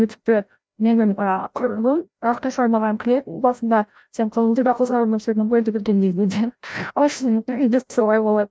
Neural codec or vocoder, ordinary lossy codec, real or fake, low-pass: codec, 16 kHz, 0.5 kbps, FreqCodec, larger model; none; fake; none